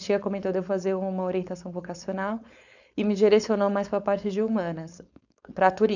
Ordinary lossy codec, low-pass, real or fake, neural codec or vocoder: none; 7.2 kHz; fake; codec, 16 kHz, 4.8 kbps, FACodec